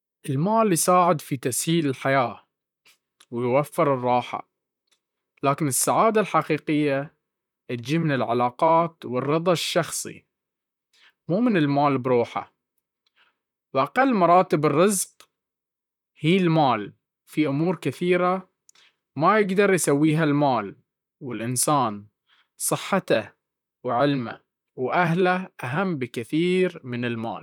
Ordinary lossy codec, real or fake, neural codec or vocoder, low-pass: none; fake; vocoder, 44.1 kHz, 128 mel bands, Pupu-Vocoder; 19.8 kHz